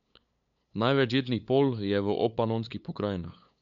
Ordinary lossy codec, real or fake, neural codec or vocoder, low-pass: none; fake; codec, 16 kHz, 8 kbps, FunCodec, trained on LibriTTS, 25 frames a second; 7.2 kHz